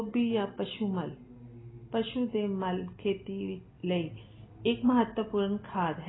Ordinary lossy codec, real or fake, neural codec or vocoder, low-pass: AAC, 16 kbps; real; none; 7.2 kHz